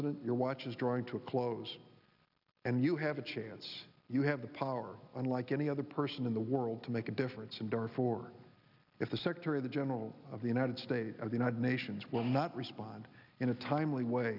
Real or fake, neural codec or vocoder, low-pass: real; none; 5.4 kHz